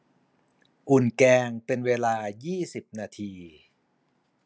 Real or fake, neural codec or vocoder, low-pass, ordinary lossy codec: real; none; none; none